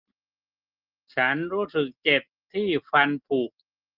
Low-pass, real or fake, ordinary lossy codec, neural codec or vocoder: 5.4 kHz; real; Opus, 24 kbps; none